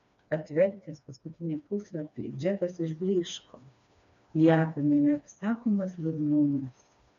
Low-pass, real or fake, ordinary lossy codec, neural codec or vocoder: 7.2 kHz; fake; AAC, 96 kbps; codec, 16 kHz, 2 kbps, FreqCodec, smaller model